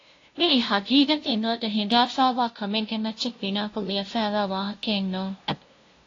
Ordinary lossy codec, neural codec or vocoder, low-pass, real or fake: AAC, 32 kbps; codec, 16 kHz, 0.5 kbps, FunCodec, trained on LibriTTS, 25 frames a second; 7.2 kHz; fake